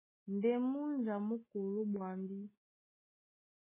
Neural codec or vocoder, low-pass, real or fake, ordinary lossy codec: none; 3.6 kHz; real; MP3, 16 kbps